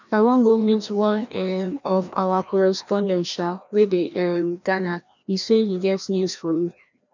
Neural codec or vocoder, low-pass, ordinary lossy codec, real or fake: codec, 16 kHz, 1 kbps, FreqCodec, larger model; 7.2 kHz; none; fake